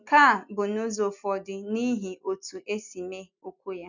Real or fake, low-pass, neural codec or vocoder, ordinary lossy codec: real; 7.2 kHz; none; none